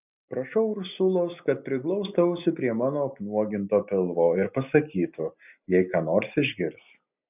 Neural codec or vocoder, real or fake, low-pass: none; real; 3.6 kHz